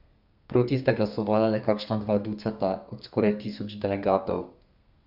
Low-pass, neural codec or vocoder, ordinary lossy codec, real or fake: 5.4 kHz; codec, 32 kHz, 1.9 kbps, SNAC; none; fake